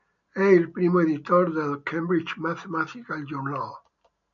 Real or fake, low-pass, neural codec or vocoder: real; 7.2 kHz; none